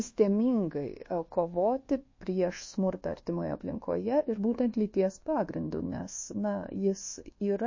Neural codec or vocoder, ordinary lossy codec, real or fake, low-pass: codec, 24 kHz, 1.2 kbps, DualCodec; MP3, 32 kbps; fake; 7.2 kHz